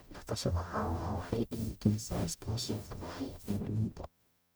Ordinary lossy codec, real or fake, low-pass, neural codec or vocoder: none; fake; none; codec, 44.1 kHz, 0.9 kbps, DAC